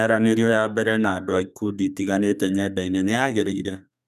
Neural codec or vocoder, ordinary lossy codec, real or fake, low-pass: codec, 32 kHz, 1.9 kbps, SNAC; none; fake; 14.4 kHz